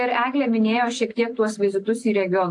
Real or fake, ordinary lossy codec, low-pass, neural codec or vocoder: real; AAC, 48 kbps; 10.8 kHz; none